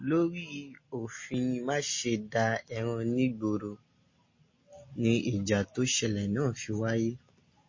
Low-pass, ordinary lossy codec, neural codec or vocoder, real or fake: 7.2 kHz; MP3, 32 kbps; none; real